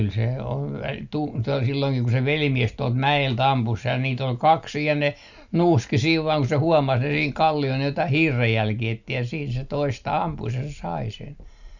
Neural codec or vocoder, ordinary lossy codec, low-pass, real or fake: none; none; 7.2 kHz; real